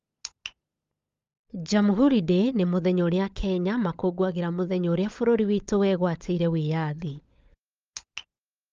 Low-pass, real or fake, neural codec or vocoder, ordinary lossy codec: 7.2 kHz; fake; codec, 16 kHz, 16 kbps, FunCodec, trained on LibriTTS, 50 frames a second; Opus, 32 kbps